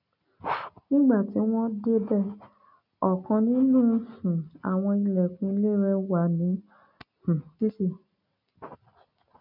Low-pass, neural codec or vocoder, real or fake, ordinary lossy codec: 5.4 kHz; none; real; none